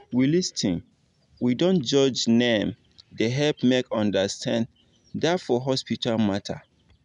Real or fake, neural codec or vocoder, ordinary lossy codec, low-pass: real; none; none; 10.8 kHz